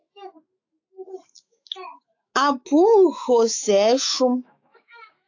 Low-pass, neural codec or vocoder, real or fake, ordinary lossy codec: 7.2 kHz; autoencoder, 48 kHz, 128 numbers a frame, DAC-VAE, trained on Japanese speech; fake; AAC, 48 kbps